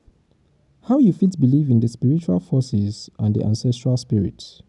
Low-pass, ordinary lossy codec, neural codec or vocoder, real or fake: 10.8 kHz; none; none; real